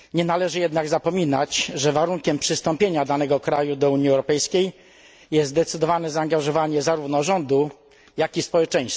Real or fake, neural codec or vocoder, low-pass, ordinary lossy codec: real; none; none; none